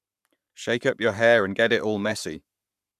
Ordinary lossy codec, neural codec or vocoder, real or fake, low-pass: none; codec, 44.1 kHz, 7.8 kbps, Pupu-Codec; fake; 14.4 kHz